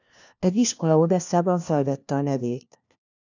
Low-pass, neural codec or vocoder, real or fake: 7.2 kHz; codec, 16 kHz, 1 kbps, FunCodec, trained on LibriTTS, 50 frames a second; fake